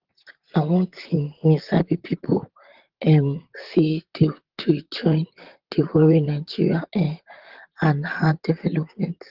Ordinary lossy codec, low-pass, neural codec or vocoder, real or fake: Opus, 16 kbps; 5.4 kHz; vocoder, 44.1 kHz, 128 mel bands, Pupu-Vocoder; fake